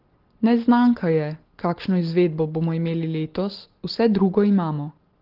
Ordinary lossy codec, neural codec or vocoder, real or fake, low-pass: Opus, 16 kbps; none; real; 5.4 kHz